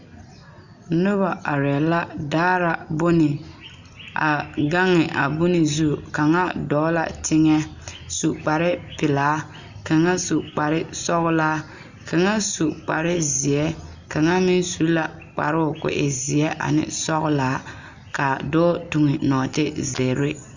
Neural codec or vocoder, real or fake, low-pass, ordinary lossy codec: none; real; 7.2 kHz; Opus, 64 kbps